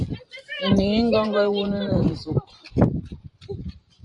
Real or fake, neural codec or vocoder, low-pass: fake; vocoder, 44.1 kHz, 128 mel bands every 512 samples, BigVGAN v2; 10.8 kHz